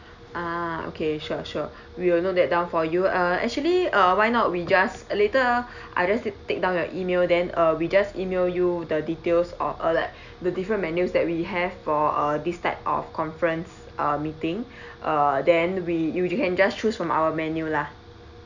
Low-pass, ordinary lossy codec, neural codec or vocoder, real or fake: 7.2 kHz; none; none; real